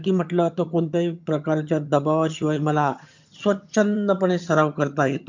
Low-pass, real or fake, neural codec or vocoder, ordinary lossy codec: 7.2 kHz; fake; vocoder, 22.05 kHz, 80 mel bands, HiFi-GAN; MP3, 64 kbps